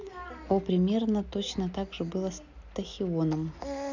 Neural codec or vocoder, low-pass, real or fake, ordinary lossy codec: none; 7.2 kHz; real; none